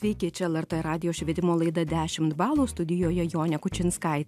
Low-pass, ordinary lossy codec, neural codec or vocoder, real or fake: 14.4 kHz; AAC, 96 kbps; none; real